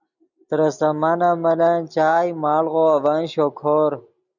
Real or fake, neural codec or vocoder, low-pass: real; none; 7.2 kHz